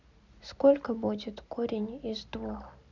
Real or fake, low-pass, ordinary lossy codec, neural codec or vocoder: real; 7.2 kHz; none; none